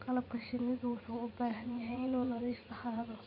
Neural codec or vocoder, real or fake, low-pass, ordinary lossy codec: vocoder, 44.1 kHz, 80 mel bands, Vocos; fake; 5.4 kHz; none